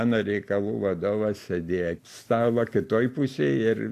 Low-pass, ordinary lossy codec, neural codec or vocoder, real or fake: 14.4 kHz; AAC, 64 kbps; none; real